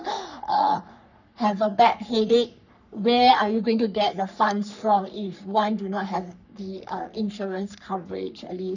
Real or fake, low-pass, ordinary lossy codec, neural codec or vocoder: fake; 7.2 kHz; none; codec, 44.1 kHz, 3.4 kbps, Pupu-Codec